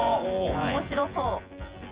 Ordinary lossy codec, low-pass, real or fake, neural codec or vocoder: Opus, 32 kbps; 3.6 kHz; fake; vocoder, 24 kHz, 100 mel bands, Vocos